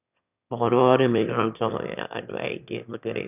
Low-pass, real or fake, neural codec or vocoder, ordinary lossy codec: 3.6 kHz; fake; autoencoder, 22.05 kHz, a latent of 192 numbers a frame, VITS, trained on one speaker; none